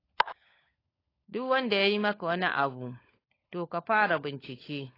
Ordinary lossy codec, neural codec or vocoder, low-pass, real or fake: AAC, 24 kbps; codec, 16 kHz, 16 kbps, FunCodec, trained on LibriTTS, 50 frames a second; 5.4 kHz; fake